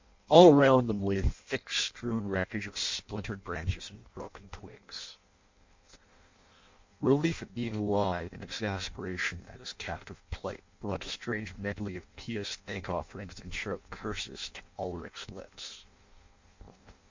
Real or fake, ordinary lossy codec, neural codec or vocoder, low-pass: fake; MP3, 48 kbps; codec, 16 kHz in and 24 kHz out, 0.6 kbps, FireRedTTS-2 codec; 7.2 kHz